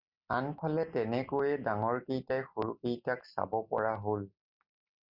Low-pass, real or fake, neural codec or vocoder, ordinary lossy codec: 5.4 kHz; real; none; Opus, 64 kbps